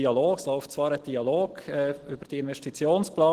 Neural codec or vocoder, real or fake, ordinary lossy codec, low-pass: none; real; Opus, 16 kbps; 14.4 kHz